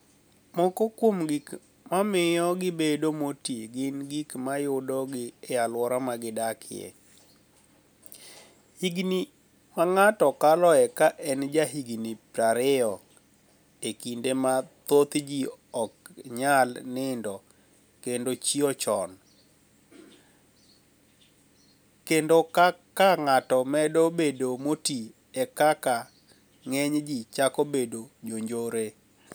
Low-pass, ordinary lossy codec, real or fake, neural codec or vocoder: none; none; real; none